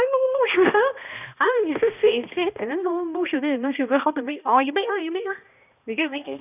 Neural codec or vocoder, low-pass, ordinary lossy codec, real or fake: codec, 16 kHz, 1 kbps, X-Codec, HuBERT features, trained on balanced general audio; 3.6 kHz; none; fake